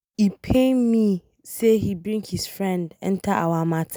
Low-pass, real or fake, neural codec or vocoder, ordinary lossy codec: none; real; none; none